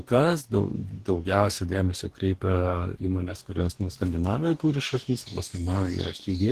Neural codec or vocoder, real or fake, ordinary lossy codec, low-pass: codec, 44.1 kHz, 2.6 kbps, DAC; fake; Opus, 16 kbps; 14.4 kHz